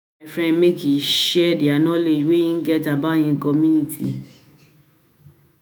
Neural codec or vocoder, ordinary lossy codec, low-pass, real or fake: autoencoder, 48 kHz, 128 numbers a frame, DAC-VAE, trained on Japanese speech; none; none; fake